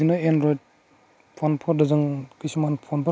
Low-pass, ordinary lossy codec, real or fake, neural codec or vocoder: none; none; real; none